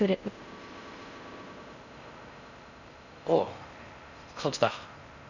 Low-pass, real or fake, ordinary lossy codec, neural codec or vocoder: 7.2 kHz; fake; none; codec, 16 kHz in and 24 kHz out, 0.6 kbps, FocalCodec, streaming, 4096 codes